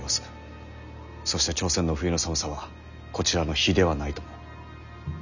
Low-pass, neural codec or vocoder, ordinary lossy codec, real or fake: 7.2 kHz; none; none; real